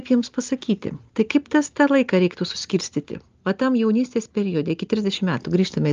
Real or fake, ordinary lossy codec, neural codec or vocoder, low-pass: real; Opus, 32 kbps; none; 7.2 kHz